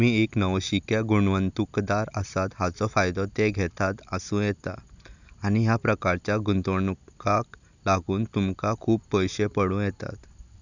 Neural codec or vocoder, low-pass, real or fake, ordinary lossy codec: none; 7.2 kHz; real; none